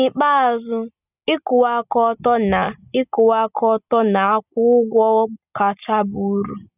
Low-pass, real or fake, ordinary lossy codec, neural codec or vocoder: 3.6 kHz; real; none; none